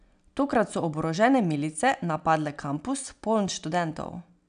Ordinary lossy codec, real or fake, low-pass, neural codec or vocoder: none; real; 9.9 kHz; none